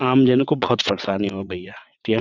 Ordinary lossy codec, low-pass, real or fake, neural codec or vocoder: none; 7.2 kHz; fake; vocoder, 22.05 kHz, 80 mel bands, WaveNeXt